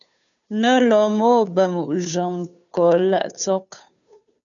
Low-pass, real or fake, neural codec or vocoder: 7.2 kHz; fake; codec, 16 kHz, 2 kbps, FunCodec, trained on Chinese and English, 25 frames a second